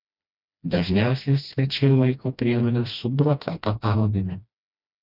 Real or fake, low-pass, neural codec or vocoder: fake; 5.4 kHz; codec, 16 kHz, 1 kbps, FreqCodec, smaller model